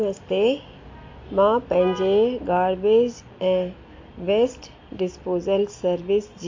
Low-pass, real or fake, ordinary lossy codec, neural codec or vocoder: 7.2 kHz; real; MP3, 48 kbps; none